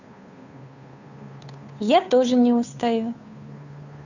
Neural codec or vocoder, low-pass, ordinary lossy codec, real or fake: codec, 16 kHz, 2 kbps, FunCodec, trained on Chinese and English, 25 frames a second; 7.2 kHz; none; fake